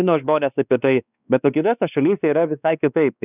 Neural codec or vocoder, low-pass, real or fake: codec, 16 kHz, 2 kbps, X-Codec, WavLM features, trained on Multilingual LibriSpeech; 3.6 kHz; fake